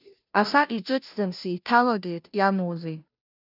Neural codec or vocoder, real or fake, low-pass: codec, 16 kHz, 0.5 kbps, FunCodec, trained on Chinese and English, 25 frames a second; fake; 5.4 kHz